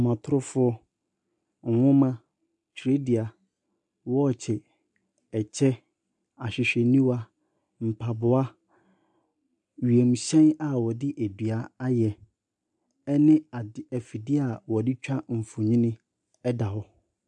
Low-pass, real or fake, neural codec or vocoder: 10.8 kHz; real; none